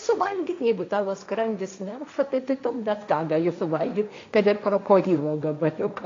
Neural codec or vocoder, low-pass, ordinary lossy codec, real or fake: codec, 16 kHz, 1.1 kbps, Voila-Tokenizer; 7.2 kHz; AAC, 48 kbps; fake